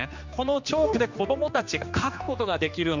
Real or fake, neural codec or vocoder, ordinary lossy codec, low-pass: fake; codec, 16 kHz, 2 kbps, X-Codec, HuBERT features, trained on general audio; MP3, 64 kbps; 7.2 kHz